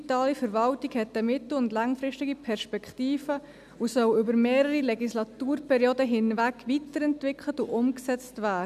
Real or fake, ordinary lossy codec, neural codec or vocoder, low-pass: real; none; none; 14.4 kHz